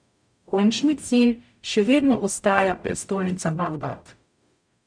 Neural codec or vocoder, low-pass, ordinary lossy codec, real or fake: codec, 44.1 kHz, 0.9 kbps, DAC; 9.9 kHz; none; fake